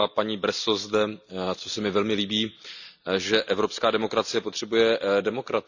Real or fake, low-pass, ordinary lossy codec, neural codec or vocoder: real; 7.2 kHz; none; none